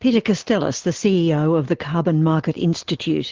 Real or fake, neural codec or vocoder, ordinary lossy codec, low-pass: real; none; Opus, 16 kbps; 7.2 kHz